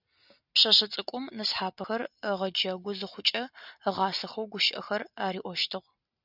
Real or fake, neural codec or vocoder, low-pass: real; none; 5.4 kHz